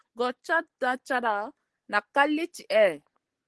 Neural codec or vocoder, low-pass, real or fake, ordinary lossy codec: none; 10.8 kHz; real; Opus, 16 kbps